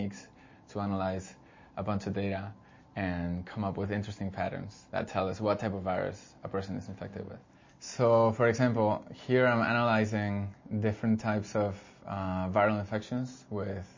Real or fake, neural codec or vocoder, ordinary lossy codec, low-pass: real; none; MP3, 32 kbps; 7.2 kHz